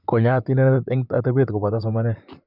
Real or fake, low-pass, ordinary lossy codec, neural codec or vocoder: fake; 5.4 kHz; none; vocoder, 44.1 kHz, 128 mel bands, Pupu-Vocoder